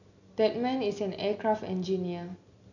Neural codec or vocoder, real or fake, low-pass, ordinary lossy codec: none; real; 7.2 kHz; none